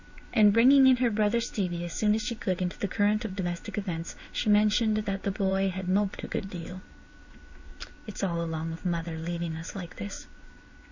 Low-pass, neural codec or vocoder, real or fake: 7.2 kHz; codec, 16 kHz in and 24 kHz out, 1 kbps, XY-Tokenizer; fake